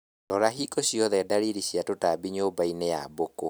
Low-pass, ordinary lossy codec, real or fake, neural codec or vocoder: none; none; real; none